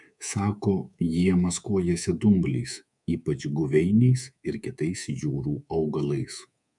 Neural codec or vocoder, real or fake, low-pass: codec, 24 kHz, 3.1 kbps, DualCodec; fake; 10.8 kHz